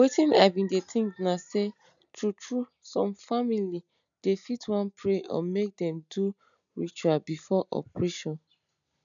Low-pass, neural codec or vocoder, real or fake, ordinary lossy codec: 7.2 kHz; none; real; none